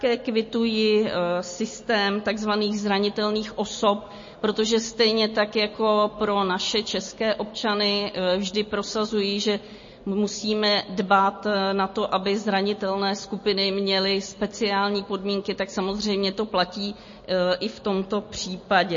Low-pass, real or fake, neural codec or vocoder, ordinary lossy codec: 7.2 kHz; real; none; MP3, 32 kbps